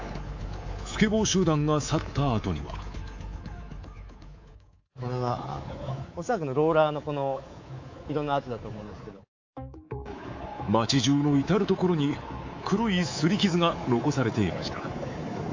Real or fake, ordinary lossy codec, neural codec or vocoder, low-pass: fake; AAC, 48 kbps; codec, 24 kHz, 3.1 kbps, DualCodec; 7.2 kHz